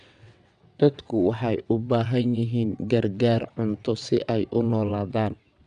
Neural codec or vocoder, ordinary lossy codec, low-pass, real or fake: vocoder, 22.05 kHz, 80 mel bands, WaveNeXt; none; 9.9 kHz; fake